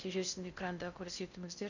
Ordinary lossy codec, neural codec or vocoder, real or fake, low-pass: none; codec, 16 kHz in and 24 kHz out, 0.6 kbps, FocalCodec, streaming, 4096 codes; fake; 7.2 kHz